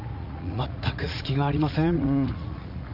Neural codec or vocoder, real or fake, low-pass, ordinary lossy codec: vocoder, 44.1 kHz, 80 mel bands, Vocos; fake; 5.4 kHz; none